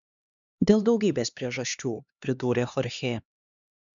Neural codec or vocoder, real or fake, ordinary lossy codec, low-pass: codec, 16 kHz, 2 kbps, X-Codec, HuBERT features, trained on LibriSpeech; fake; MP3, 96 kbps; 7.2 kHz